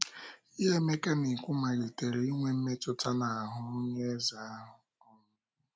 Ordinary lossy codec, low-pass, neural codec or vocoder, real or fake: none; none; none; real